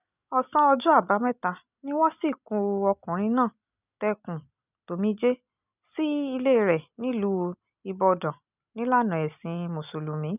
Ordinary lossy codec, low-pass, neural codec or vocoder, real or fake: none; 3.6 kHz; none; real